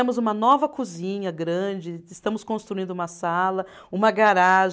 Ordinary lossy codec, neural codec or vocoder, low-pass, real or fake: none; none; none; real